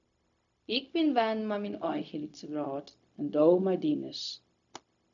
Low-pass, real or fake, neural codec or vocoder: 7.2 kHz; fake; codec, 16 kHz, 0.4 kbps, LongCat-Audio-Codec